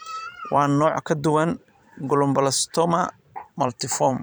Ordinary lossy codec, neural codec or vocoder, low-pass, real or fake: none; vocoder, 44.1 kHz, 128 mel bands every 512 samples, BigVGAN v2; none; fake